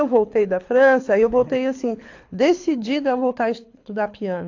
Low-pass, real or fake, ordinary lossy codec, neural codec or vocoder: 7.2 kHz; fake; AAC, 48 kbps; codec, 24 kHz, 6 kbps, HILCodec